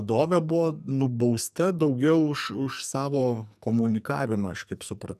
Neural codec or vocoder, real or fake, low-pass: codec, 44.1 kHz, 2.6 kbps, SNAC; fake; 14.4 kHz